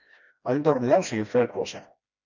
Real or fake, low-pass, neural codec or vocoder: fake; 7.2 kHz; codec, 16 kHz, 1 kbps, FreqCodec, smaller model